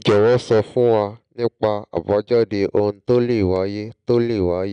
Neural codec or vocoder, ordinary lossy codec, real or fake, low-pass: none; none; real; 9.9 kHz